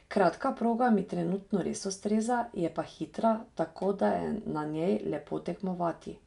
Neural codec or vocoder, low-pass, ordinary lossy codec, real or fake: none; 10.8 kHz; none; real